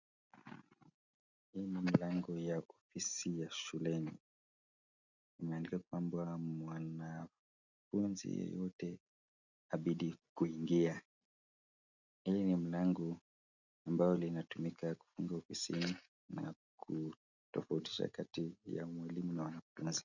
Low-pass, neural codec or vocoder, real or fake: 7.2 kHz; none; real